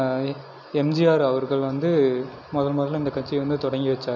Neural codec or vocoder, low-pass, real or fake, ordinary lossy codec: none; none; real; none